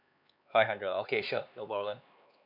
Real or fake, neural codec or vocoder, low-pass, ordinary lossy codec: fake; codec, 16 kHz, 4 kbps, X-Codec, HuBERT features, trained on LibriSpeech; 5.4 kHz; none